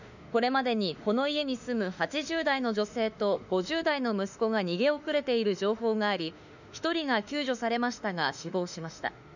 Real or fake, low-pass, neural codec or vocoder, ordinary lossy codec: fake; 7.2 kHz; autoencoder, 48 kHz, 32 numbers a frame, DAC-VAE, trained on Japanese speech; none